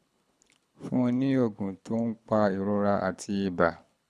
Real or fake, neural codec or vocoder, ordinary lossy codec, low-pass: fake; codec, 24 kHz, 6 kbps, HILCodec; none; none